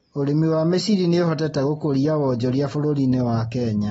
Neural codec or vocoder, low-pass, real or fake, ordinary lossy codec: none; 19.8 kHz; real; AAC, 24 kbps